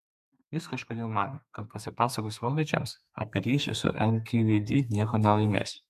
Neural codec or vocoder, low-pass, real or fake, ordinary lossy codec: codec, 32 kHz, 1.9 kbps, SNAC; 14.4 kHz; fake; MP3, 96 kbps